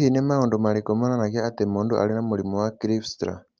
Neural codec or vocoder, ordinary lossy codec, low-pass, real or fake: none; Opus, 24 kbps; 7.2 kHz; real